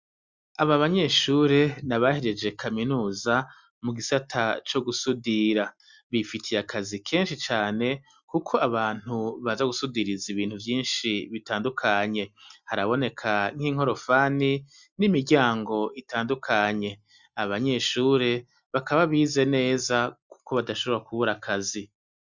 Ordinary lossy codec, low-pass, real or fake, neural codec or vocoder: Opus, 64 kbps; 7.2 kHz; real; none